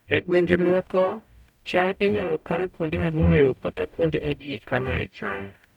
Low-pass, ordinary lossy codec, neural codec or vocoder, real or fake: 19.8 kHz; none; codec, 44.1 kHz, 0.9 kbps, DAC; fake